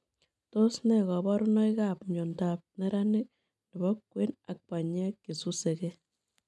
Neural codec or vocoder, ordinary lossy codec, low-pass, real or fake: none; none; none; real